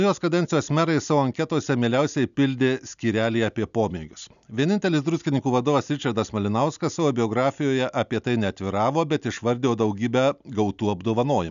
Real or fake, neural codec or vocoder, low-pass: real; none; 7.2 kHz